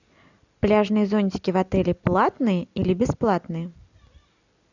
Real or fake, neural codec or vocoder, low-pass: real; none; 7.2 kHz